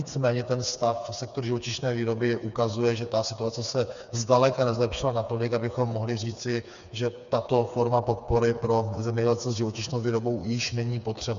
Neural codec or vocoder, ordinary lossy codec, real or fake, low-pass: codec, 16 kHz, 4 kbps, FreqCodec, smaller model; MP3, 96 kbps; fake; 7.2 kHz